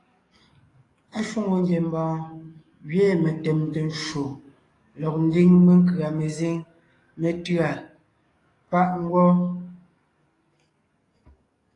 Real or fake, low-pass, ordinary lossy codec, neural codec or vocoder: fake; 10.8 kHz; AAC, 32 kbps; codec, 44.1 kHz, 7.8 kbps, DAC